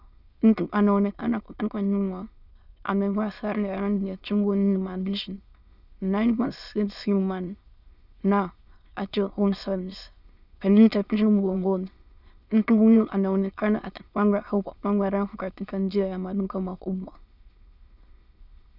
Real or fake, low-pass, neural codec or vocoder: fake; 5.4 kHz; autoencoder, 22.05 kHz, a latent of 192 numbers a frame, VITS, trained on many speakers